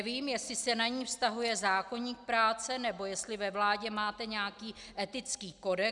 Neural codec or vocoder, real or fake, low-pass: none; real; 10.8 kHz